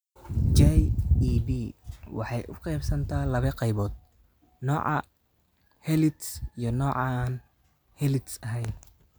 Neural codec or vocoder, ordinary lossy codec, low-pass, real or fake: none; none; none; real